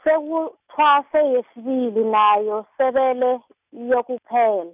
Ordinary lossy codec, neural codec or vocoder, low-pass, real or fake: none; none; 3.6 kHz; real